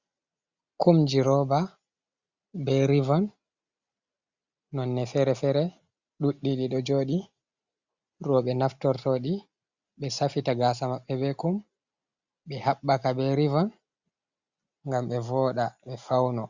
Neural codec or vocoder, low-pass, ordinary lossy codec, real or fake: none; 7.2 kHz; Opus, 64 kbps; real